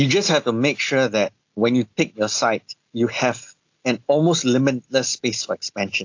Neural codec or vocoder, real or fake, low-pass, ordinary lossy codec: none; real; 7.2 kHz; AAC, 48 kbps